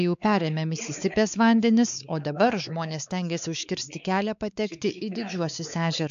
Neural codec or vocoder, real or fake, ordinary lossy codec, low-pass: codec, 16 kHz, 4 kbps, X-Codec, WavLM features, trained on Multilingual LibriSpeech; fake; AAC, 96 kbps; 7.2 kHz